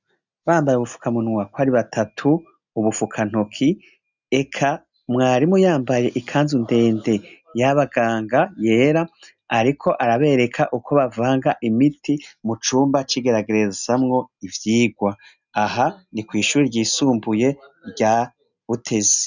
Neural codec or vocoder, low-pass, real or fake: none; 7.2 kHz; real